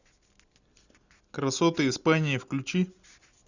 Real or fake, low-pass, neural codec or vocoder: real; 7.2 kHz; none